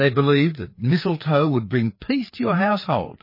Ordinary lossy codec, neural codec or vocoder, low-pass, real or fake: MP3, 24 kbps; codec, 16 kHz, 4 kbps, FreqCodec, larger model; 5.4 kHz; fake